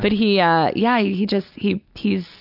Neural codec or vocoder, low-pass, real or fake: none; 5.4 kHz; real